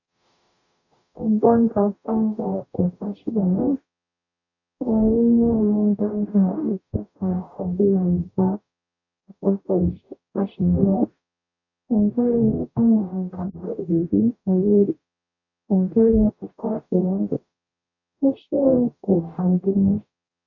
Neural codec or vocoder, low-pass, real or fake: codec, 44.1 kHz, 0.9 kbps, DAC; 7.2 kHz; fake